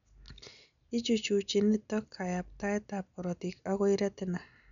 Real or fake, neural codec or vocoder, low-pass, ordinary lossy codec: real; none; 7.2 kHz; none